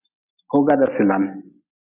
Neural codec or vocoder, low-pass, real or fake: none; 3.6 kHz; real